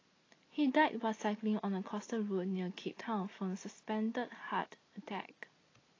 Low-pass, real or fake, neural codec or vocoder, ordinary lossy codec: 7.2 kHz; real; none; AAC, 32 kbps